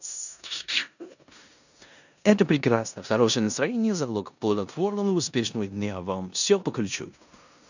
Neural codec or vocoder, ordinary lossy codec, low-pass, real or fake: codec, 16 kHz in and 24 kHz out, 0.9 kbps, LongCat-Audio-Codec, four codebook decoder; none; 7.2 kHz; fake